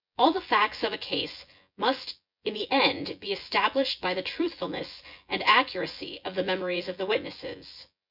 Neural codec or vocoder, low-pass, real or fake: vocoder, 24 kHz, 100 mel bands, Vocos; 5.4 kHz; fake